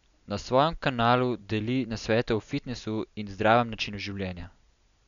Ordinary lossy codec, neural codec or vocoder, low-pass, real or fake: none; none; 7.2 kHz; real